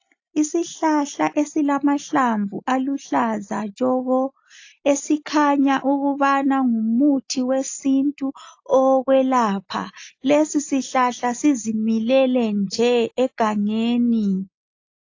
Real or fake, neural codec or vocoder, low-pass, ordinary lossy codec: real; none; 7.2 kHz; AAC, 48 kbps